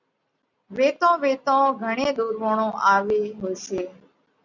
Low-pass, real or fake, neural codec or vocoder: 7.2 kHz; real; none